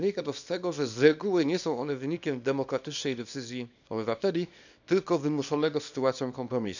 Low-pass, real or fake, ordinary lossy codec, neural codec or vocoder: 7.2 kHz; fake; none; codec, 24 kHz, 0.9 kbps, WavTokenizer, small release